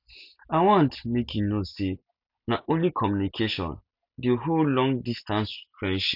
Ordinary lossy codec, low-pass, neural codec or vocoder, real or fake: none; 5.4 kHz; none; real